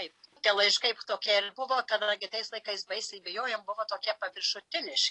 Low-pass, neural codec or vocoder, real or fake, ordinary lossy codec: 10.8 kHz; none; real; AAC, 48 kbps